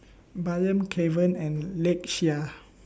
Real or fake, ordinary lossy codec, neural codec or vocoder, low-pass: real; none; none; none